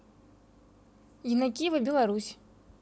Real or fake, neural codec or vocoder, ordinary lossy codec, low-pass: real; none; none; none